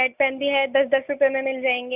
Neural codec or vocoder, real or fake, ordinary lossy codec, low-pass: none; real; none; 3.6 kHz